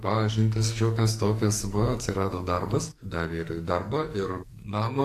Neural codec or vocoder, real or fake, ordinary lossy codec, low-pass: codec, 44.1 kHz, 2.6 kbps, SNAC; fake; AAC, 64 kbps; 14.4 kHz